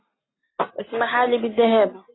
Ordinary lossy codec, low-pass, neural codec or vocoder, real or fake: AAC, 16 kbps; 7.2 kHz; none; real